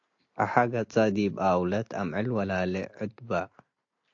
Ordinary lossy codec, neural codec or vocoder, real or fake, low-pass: AAC, 48 kbps; none; real; 7.2 kHz